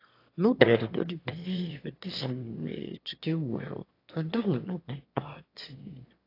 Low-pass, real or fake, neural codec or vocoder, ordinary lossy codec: 5.4 kHz; fake; autoencoder, 22.05 kHz, a latent of 192 numbers a frame, VITS, trained on one speaker; AAC, 32 kbps